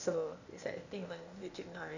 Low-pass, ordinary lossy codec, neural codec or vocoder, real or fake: 7.2 kHz; none; codec, 16 kHz, 0.8 kbps, ZipCodec; fake